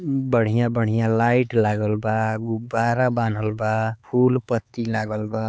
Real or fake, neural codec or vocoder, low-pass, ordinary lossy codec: fake; codec, 16 kHz, 4 kbps, X-Codec, HuBERT features, trained on LibriSpeech; none; none